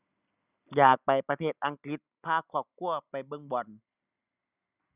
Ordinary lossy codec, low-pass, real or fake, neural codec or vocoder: Opus, 64 kbps; 3.6 kHz; real; none